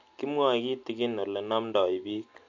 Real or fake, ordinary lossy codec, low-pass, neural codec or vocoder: real; AAC, 48 kbps; 7.2 kHz; none